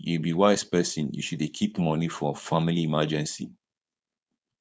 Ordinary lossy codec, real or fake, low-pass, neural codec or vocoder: none; fake; none; codec, 16 kHz, 4.8 kbps, FACodec